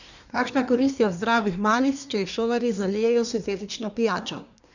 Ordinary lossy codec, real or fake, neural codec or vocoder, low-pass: none; fake; codec, 24 kHz, 1 kbps, SNAC; 7.2 kHz